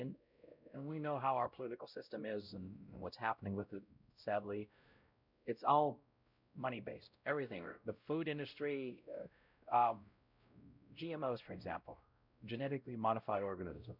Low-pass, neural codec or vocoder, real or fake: 5.4 kHz; codec, 16 kHz, 0.5 kbps, X-Codec, WavLM features, trained on Multilingual LibriSpeech; fake